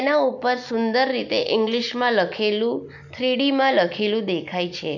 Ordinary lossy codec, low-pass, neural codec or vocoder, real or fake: none; 7.2 kHz; none; real